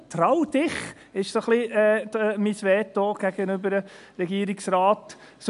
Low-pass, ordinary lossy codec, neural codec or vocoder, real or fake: 10.8 kHz; AAC, 96 kbps; none; real